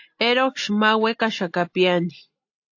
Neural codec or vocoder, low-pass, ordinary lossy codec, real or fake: none; 7.2 kHz; AAC, 48 kbps; real